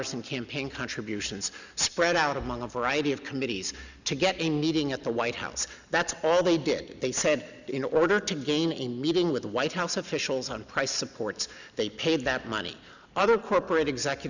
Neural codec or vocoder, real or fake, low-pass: none; real; 7.2 kHz